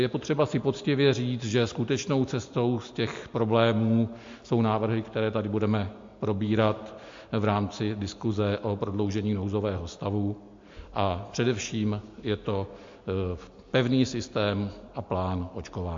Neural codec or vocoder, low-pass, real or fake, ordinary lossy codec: none; 7.2 kHz; real; MP3, 48 kbps